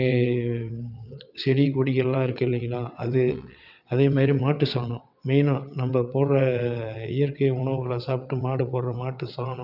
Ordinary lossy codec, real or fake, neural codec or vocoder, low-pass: none; fake; vocoder, 22.05 kHz, 80 mel bands, WaveNeXt; 5.4 kHz